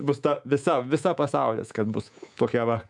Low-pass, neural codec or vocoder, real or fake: 10.8 kHz; codec, 24 kHz, 3.1 kbps, DualCodec; fake